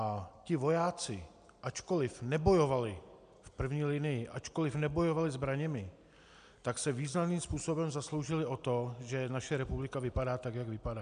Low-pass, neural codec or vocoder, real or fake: 9.9 kHz; none; real